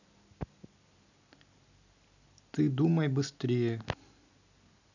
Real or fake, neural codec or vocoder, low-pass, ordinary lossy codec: real; none; 7.2 kHz; none